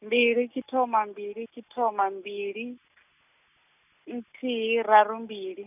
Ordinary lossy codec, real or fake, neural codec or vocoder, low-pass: none; real; none; 3.6 kHz